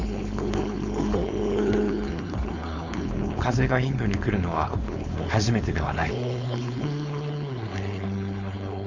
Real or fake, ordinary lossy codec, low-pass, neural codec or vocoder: fake; Opus, 64 kbps; 7.2 kHz; codec, 16 kHz, 4.8 kbps, FACodec